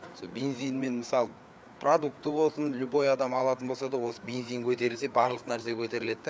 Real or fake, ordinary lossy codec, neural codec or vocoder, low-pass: fake; none; codec, 16 kHz, 4 kbps, FreqCodec, larger model; none